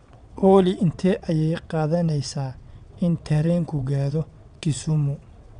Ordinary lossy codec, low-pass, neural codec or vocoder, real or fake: none; 9.9 kHz; vocoder, 22.05 kHz, 80 mel bands, WaveNeXt; fake